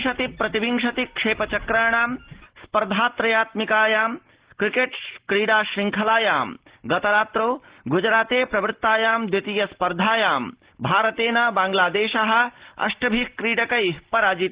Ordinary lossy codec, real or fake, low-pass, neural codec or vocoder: Opus, 16 kbps; real; 3.6 kHz; none